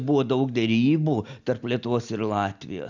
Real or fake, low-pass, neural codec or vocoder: real; 7.2 kHz; none